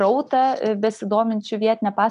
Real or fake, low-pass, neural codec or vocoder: real; 10.8 kHz; none